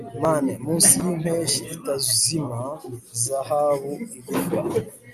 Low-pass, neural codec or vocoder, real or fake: 14.4 kHz; none; real